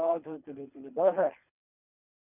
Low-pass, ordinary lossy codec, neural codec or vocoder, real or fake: 3.6 kHz; none; codec, 24 kHz, 3 kbps, HILCodec; fake